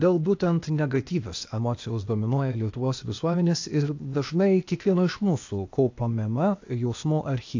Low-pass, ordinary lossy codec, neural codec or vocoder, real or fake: 7.2 kHz; AAC, 48 kbps; codec, 16 kHz, 0.8 kbps, ZipCodec; fake